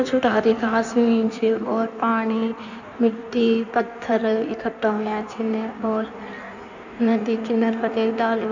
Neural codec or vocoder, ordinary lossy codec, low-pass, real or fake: codec, 16 kHz in and 24 kHz out, 1.1 kbps, FireRedTTS-2 codec; none; 7.2 kHz; fake